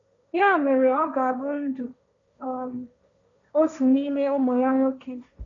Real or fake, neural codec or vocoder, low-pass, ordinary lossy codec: fake; codec, 16 kHz, 1.1 kbps, Voila-Tokenizer; 7.2 kHz; none